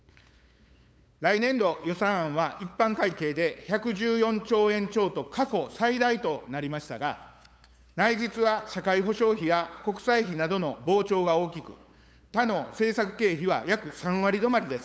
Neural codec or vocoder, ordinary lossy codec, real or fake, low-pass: codec, 16 kHz, 8 kbps, FunCodec, trained on LibriTTS, 25 frames a second; none; fake; none